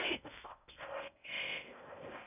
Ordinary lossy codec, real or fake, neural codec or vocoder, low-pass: none; fake; codec, 16 kHz in and 24 kHz out, 0.6 kbps, FocalCodec, streaming, 2048 codes; 3.6 kHz